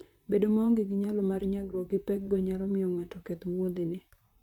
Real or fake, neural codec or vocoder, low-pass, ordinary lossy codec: fake; vocoder, 44.1 kHz, 128 mel bands, Pupu-Vocoder; 19.8 kHz; none